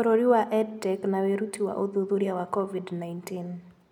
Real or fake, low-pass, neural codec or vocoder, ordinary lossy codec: real; 19.8 kHz; none; none